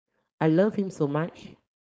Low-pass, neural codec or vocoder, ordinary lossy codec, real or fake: none; codec, 16 kHz, 4.8 kbps, FACodec; none; fake